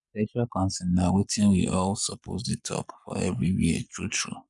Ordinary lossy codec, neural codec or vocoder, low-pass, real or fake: none; codec, 44.1 kHz, 7.8 kbps, Pupu-Codec; 10.8 kHz; fake